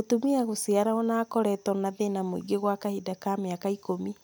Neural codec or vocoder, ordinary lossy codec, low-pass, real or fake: none; none; none; real